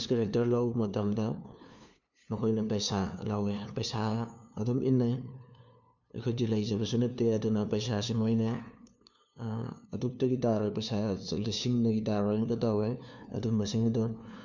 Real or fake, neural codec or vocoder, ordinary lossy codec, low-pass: fake; codec, 16 kHz, 2 kbps, FunCodec, trained on LibriTTS, 25 frames a second; none; 7.2 kHz